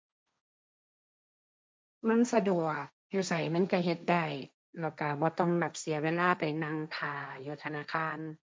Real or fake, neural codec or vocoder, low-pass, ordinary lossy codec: fake; codec, 16 kHz, 1.1 kbps, Voila-Tokenizer; none; none